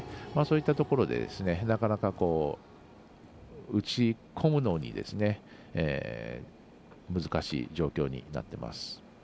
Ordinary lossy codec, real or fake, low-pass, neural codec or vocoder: none; real; none; none